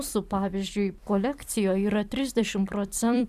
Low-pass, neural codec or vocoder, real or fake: 14.4 kHz; vocoder, 44.1 kHz, 128 mel bands every 256 samples, BigVGAN v2; fake